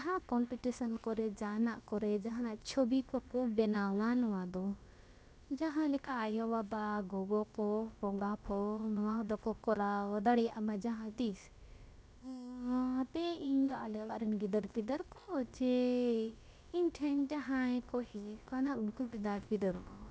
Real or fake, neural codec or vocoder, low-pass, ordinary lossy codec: fake; codec, 16 kHz, about 1 kbps, DyCAST, with the encoder's durations; none; none